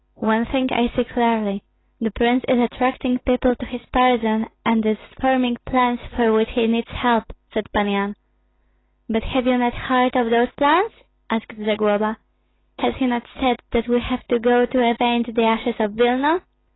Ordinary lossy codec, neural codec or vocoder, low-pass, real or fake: AAC, 16 kbps; none; 7.2 kHz; real